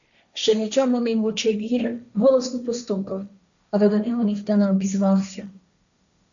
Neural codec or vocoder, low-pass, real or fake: codec, 16 kHz, 1.1 kbps, Voila-Tokenizer; 7.2 kHz; fake